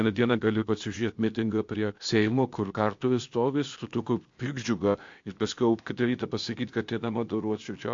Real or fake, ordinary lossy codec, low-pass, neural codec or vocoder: fake; MP3, 48 kbps; 7.2 kHz; codec, 16 kHz, 0.8 kbps, ZipCodec